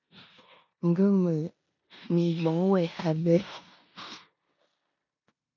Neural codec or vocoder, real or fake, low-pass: codec, 16 kHz in and 24 kHz out, 0.9 kbps, LongCat-Audio-Codec, four codebook decoder; fake; 7.2 kHz